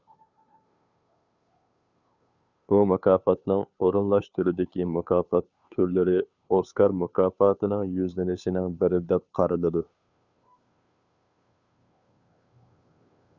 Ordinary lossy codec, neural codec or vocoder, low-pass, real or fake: Opus, 64 kbps; codec, 16 kHz, 2 kbps, FunCodec, trained on Chinese and English, 25 frames a second; 7.2 kHz; fake